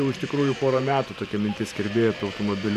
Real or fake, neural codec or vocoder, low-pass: fake; vocoder, 44.1 kHz, 128 mel bands every 512 samples, BigVGAN v2; 14.4 kHz